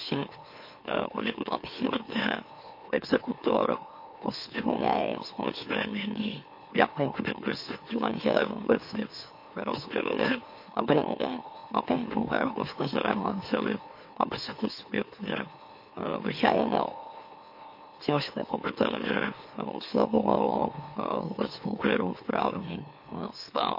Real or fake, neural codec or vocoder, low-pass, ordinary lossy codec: fake; autoencoder, 44.1 kHz, a latent of 192 numbers a frame, MeloTTS; 5.4 kHz; MP3, 32 kbps